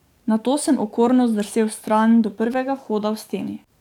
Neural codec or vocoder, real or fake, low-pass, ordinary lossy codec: codec, 44.1 kHz, 7.8 kbps, DAC; fake; 19.8 kHz; none